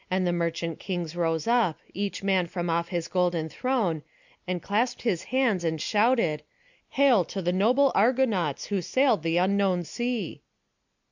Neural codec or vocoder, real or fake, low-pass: none; real; 7.2 kHz